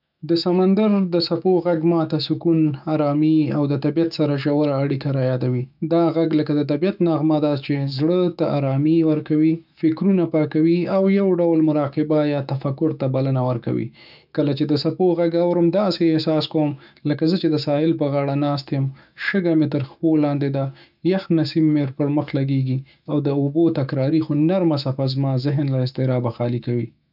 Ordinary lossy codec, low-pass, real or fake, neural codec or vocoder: none; 5.4 kHz; fake; autoencoder, 48 kHz, 128 numbers a frame, DAC-VAE, trained on Japanese speech